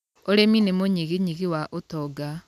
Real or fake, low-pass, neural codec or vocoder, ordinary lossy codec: real; 14.4 kHz; none; none